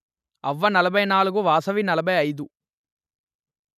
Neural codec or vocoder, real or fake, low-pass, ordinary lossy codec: none; real; 14.4 kHz; none